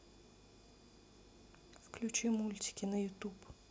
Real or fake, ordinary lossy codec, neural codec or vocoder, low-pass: real; none; none; none